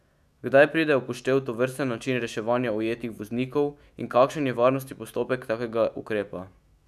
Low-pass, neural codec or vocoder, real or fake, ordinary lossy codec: 14.4 kHz; autoencoder, 48 kHz, 128 numbers a frame, DAC-VAE, trained on Japanese speech; fake; none